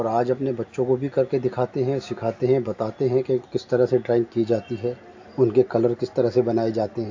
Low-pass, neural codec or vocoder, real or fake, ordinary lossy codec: 7.2 kHz; none; real; AAC, 48 kbps